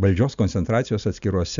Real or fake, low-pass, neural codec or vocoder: real; 7.2 kHz; none